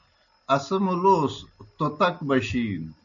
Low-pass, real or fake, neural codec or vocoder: 7.2 kHz; real; none